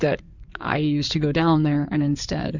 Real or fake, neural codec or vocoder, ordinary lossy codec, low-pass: fake; codec, 16 kHz in and 24 kHz out, 2.2 kbps, FireRedTTS-2 codec; Opus, 64 kbps; 7.2 kHz